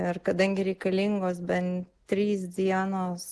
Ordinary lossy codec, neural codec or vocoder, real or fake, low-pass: Opus, 16 kbps; none; real; 10.8 kHz